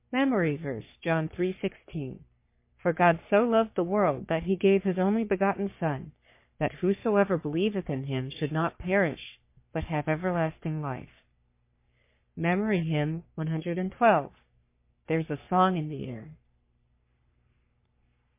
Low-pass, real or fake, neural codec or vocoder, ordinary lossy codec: 3.6 kHz; fake; codec, 44.1 kHz, 3.4 kbps, Pupu-Codec; MP3, 24 kbps